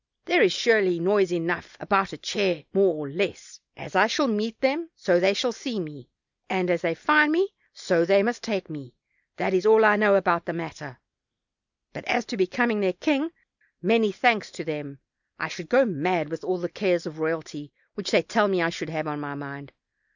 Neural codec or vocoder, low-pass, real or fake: none; 7.2 kHz; real